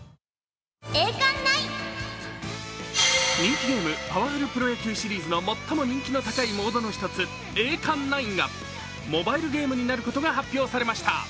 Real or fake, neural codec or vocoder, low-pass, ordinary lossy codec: real; none; none; none